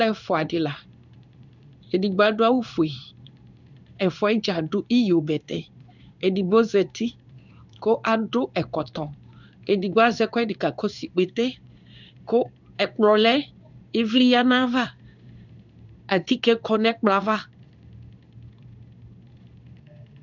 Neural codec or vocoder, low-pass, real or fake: codec, 16 kHz in and 24 kHz out, 1 kbps, XY-Tokenizer; 7.2 kHz; fake